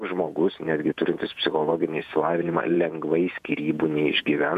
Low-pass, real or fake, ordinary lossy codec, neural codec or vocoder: 14.4 kHz; fake; MP3, 96 kbps; vocoder, 48 kHz, 128 mel bands, Vocos